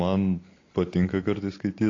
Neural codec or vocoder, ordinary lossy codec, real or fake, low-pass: none; AAC, 32 kbps; real; 7.2 kHz